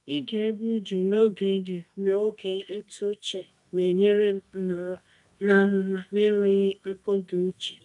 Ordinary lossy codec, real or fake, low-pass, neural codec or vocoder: none; fake; 10.8 kHz; codec, 24 kHz, 0.9 kbps, WavTokenizer, medium music audio release